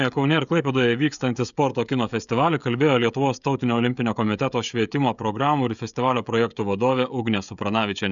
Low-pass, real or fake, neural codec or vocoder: 7.2 kHz; fake; codec, 16 kHz, 16 kbps, FreqCodec, smaller model